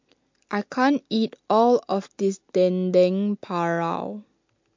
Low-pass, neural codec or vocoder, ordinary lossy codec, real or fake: 7.2 kHz; none; MP3, 48 kbps; real